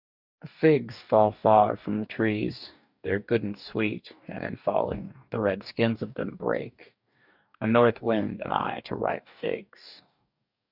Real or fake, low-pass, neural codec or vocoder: fake; 5.4 kHz; codec, 44.1 kHz, 2.6 kbps, DAC